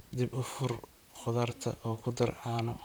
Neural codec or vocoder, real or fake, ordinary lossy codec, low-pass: vocoder, 44.1 kHz, 128 mel bands, Pupu-Vocoder; fake; none; none